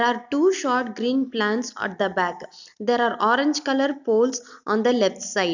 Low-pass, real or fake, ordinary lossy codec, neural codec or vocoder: 7.2 kHz; real; none; none